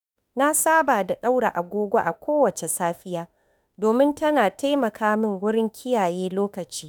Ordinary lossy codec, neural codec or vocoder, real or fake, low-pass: none; autoencoder, 48 kHz, 32 numbers a frame, DAC-VAE, trained on Japanese speech; fake; none